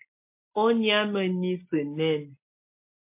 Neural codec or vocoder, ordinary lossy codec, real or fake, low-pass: none; MP3, 24 kbps; real; 3.6 kHz